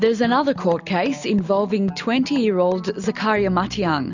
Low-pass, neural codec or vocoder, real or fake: 7.2 kHz; none; real